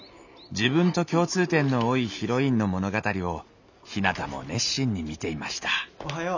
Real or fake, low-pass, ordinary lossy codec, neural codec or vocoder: real; 7.2 kHz; none; none